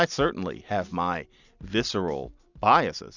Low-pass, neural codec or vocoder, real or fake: 7.2 kHz; none; real